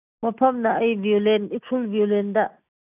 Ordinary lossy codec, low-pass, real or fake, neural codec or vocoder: none; 3.6 kHz; real; none